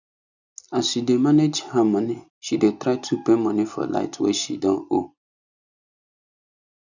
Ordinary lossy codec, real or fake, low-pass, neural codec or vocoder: none; real; 7.2 kHz; none